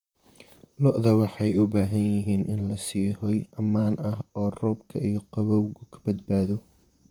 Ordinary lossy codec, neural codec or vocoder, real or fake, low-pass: none; vocoder, 44.1 kHz, 128 mel bands, Pupu-Vocoder; fake; 19.8 kHz